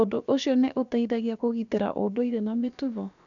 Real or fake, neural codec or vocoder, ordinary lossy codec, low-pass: fake; codec, 16 kHz, about 1 kbps, DyCAST, with the encoder's durations; none; 7.2 kHz